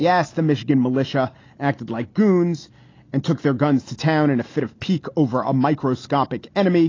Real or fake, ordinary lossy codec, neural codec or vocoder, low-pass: real; AAC, 32 kbps; none; 7.2 kHz